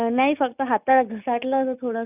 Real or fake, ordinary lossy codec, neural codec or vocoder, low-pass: real; none; none; 3.6 kHz